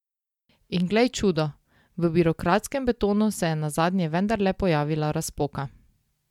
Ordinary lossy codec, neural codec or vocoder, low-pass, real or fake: MP3, 96 kbps; none; 19.8 kHz; real